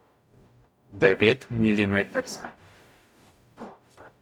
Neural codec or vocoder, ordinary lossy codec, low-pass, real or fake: codec, 44.1 kHz, 0.9 kbps, DAC; none; 19.8 kHz; fake